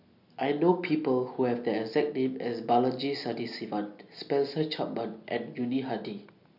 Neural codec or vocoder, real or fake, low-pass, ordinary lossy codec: none; real; 5.4 kHz; none